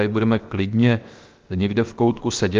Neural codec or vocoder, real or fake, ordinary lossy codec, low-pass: codec, 16 kHz, 0.7 kbps, FocalCodec; fake; Opus, 24 kbps; 7.2 kHz